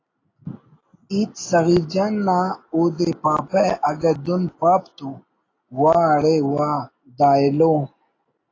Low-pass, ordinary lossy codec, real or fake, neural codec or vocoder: 7.2 kHz; AAC, 32 kbps; real; none